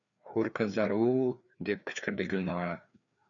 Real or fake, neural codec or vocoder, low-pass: fake; codec, 16 kHz, 2 kbps, FreqCodec, larger model; 7.2 kHz